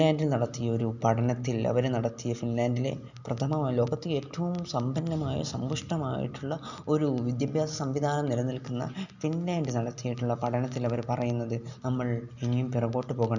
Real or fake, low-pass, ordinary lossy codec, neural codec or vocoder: real; 7.2 kHz; none; none